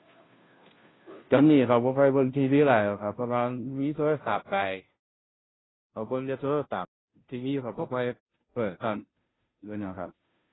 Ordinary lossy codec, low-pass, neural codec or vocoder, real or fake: AAC, 16 kbps; 7.2 kHz; codec, 16 kHz, 0.5 kbps, FunCodec, trained on Chinese and English, 25 frames a second; fake